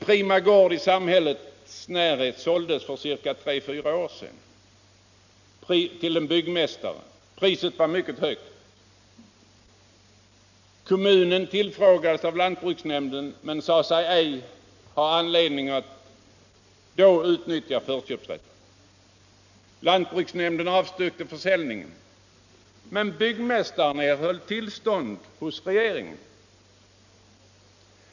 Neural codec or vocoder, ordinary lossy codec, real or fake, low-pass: none; none; real; 7.2 kHz